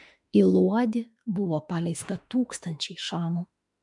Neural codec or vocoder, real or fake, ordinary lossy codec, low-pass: autoencoder, 48 kHz, 32 numbers a frame, DAC-VAE, trained on Japanese speech; fake; MP3, 64 kbps; 10.8 kHz